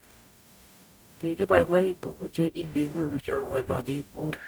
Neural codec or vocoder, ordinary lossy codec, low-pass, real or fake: codec, 44.1 kHz, 0.9 kbps, DAC; none; none; fake